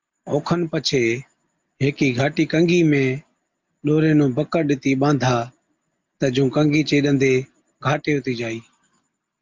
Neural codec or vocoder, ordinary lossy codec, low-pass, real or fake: none; Opus, 32 kbps; 7.2 kHz; real